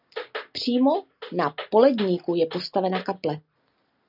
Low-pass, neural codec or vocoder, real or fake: 5.4 kHz; none; real